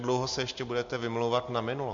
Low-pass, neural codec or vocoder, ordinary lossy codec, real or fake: 7.2 kHz; none; MP3, 48 kbps; real